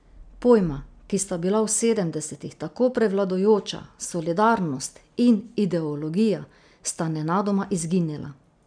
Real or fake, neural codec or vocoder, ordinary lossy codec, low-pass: real; none; none; 9.9 kHz